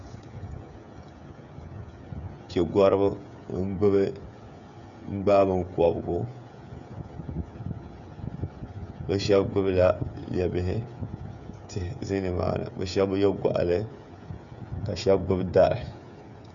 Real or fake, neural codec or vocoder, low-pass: fake; codec, 16 kHz, 16 kbps, FreqCodec, smaller model; 7.2 kHz